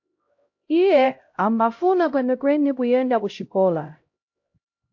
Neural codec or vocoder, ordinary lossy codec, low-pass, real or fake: codec, 16 kHz, 0.5 kbps, X-Codec, HuBERT features, trained on LibriSpeech; AAC, 48 kbps; 7.2 kHz; fake